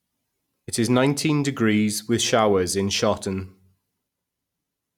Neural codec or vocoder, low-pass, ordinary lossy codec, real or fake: none; 19.8 kHz; none; real